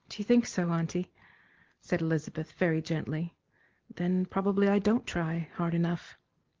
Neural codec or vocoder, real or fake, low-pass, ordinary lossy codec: none; real; 7.2 kHz; Opus, 16 kbps